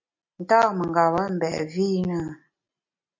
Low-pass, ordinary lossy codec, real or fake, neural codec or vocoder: 7.2 kHz; MP3, 48 kbps; real; none